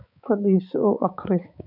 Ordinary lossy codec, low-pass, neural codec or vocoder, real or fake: none; 5.4 kHz; codec, 16 kHz, 4 kbps, X-Codec, HuBERT features, trained on balanced general audio; fake